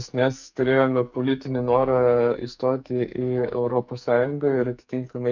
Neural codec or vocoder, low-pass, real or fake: codec, 44.1 kHz, 2.6 kbps, SNAC; 7.2 kHz; fake